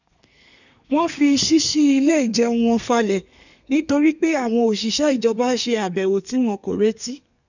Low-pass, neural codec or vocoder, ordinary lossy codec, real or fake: 7.2 kHz; codec, 32 kHz, 1.9 kbps, SNAC; none; fake